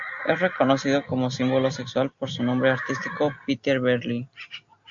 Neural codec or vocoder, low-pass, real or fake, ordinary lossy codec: none; 7.2 kHz; real; AAC, 64 kbps